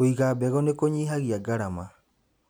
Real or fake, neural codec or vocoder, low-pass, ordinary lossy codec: real; none; none; none